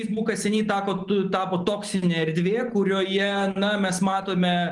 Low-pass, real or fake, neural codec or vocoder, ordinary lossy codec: 10.8 kHz; real; none; Opus, 24 kbps